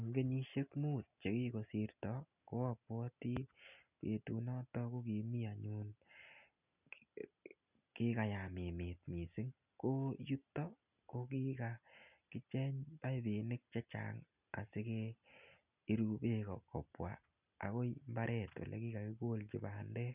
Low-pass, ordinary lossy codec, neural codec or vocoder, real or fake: 3.6 kHz; none; none; real